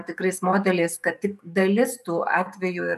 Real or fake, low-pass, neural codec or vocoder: fake; 14.4 kHz; codec, 44.1 kHz, 7.8 kbps, DAC